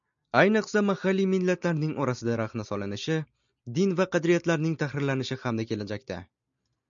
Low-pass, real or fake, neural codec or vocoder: 7.2 kHz; real; none